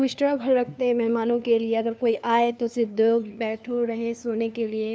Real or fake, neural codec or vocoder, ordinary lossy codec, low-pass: fake; codec, 16 kHz, 2 kbps, FunCodec, trained on LibriTTS, 25 frames a second; none; none